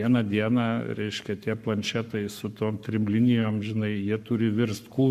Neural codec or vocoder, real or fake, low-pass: codec, 44.1 kHz, 7.8 kbps, Pupu-Codec; fake; 14.4 kHz